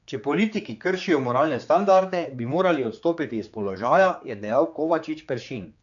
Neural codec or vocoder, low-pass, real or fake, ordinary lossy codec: codec, 16 kHz, 4 kbps, X-Codec, HuBERT features, trained on general audio; 7.2 kHz; fake; none